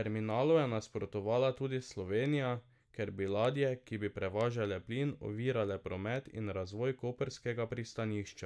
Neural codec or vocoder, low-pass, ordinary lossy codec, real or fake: none; none; none; real